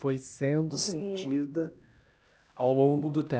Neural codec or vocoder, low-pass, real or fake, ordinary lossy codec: codec, 16 kHz, 1 kbps, X-Codec, HuBERT features, trained on LibriSpeech; none; fake; none